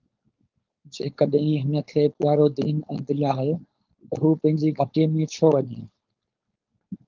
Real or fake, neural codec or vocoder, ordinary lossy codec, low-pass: fake; codec, 16 kHz, 4.8 kbps, FACodec; Opus, 32 kbps; 7.2 kHz